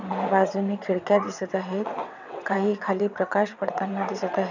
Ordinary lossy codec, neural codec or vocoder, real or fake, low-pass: none; vocoder, 22.05 kHz, 80 mel bands, WaveNeXt; fake; 7.2 kHz